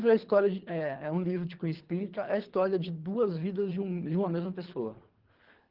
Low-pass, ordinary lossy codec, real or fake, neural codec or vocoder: 5.4 kHz; Opus, 16 kbps; fake; codec, 24 kHz, 3 kbps, HILCodec